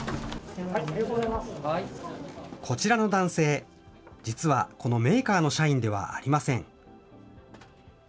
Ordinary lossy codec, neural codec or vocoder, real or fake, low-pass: none; none; real; none